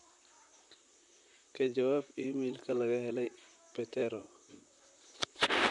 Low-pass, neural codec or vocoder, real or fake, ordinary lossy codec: 10.8 kHz; vocoder, 44.1 kHz, 128 mel bands, Pupu-Vocoder; fake; none